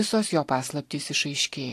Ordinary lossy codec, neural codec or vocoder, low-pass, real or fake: AAC, 64 kbps; none; 14.4 kHz; real